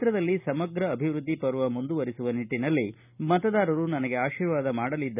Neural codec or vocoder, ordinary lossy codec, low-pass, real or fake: none; none; 3.6 kHz; real